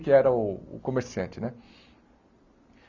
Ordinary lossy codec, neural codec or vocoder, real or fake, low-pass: Opus, 64 kbps; none; real; 7.2 kHz